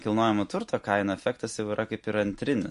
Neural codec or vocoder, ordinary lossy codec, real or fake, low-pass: none; MP3, 48 kbps; real; 14.4 kHz